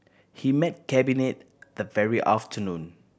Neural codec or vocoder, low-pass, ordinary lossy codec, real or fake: none; none; none; real